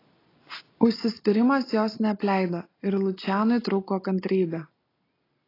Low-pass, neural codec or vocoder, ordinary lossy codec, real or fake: 5.4 kHz; none; AAC, 24 kbps; real